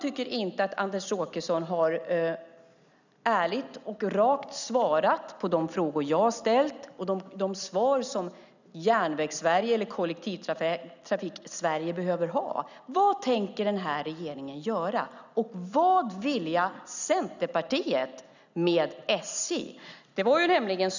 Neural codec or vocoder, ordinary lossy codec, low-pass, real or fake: none; none; 7.2 kHz; real